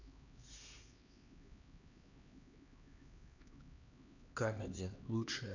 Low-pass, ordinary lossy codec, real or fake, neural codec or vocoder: 7.2 kHz; none; fake; codec, 16 kHz, 2 kbps, X-Codec, HuBERT features, trained on LibriSpeech